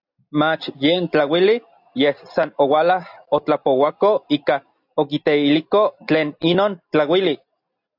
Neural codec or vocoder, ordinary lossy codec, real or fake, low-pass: none; AAC, 48 kbps; real; 5.4 kHz